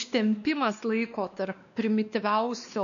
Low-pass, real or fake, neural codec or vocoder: 7.2 kHz; fake; codec, 16 kHz, 2 kbps, X-Codec, WavLM features, trained on Multilingual LibriSpeech